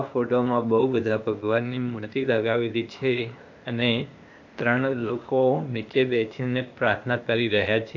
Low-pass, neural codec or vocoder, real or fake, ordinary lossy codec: 7.2 kHz; codec, 16 kHz, 0.8 kbps, ZipCodec; fake; AAC, 48 kbps